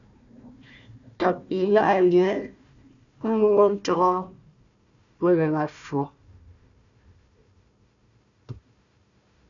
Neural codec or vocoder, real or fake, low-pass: codec, 16 kHz, 1 kbps, FunCodec, trained on Chinese and English, 50 frames a second; fake; 7.2 kHz